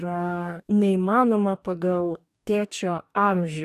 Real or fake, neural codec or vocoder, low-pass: fake; codec, 44.1 kHz, 2.6 kbps, DAC; 14.4 kHz